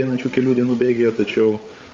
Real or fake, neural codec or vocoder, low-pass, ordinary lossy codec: fake; codec, 16 kHz, 8 kbps, FreqCodec, larger model; 7.2 kHz; Opus, 32 kbps